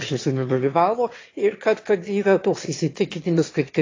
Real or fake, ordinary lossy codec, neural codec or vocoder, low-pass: fake; AAC, 32 kbps; autoencoder, 22.05 kHz, a latent of 192 numbers a frame, VITS, trained on one speaker; 7.2 kHz